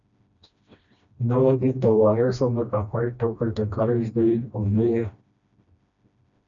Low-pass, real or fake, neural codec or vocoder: 7.2 kHz; fake; codec, 16 kHz, 1 kbps, FreqCodec, smaller model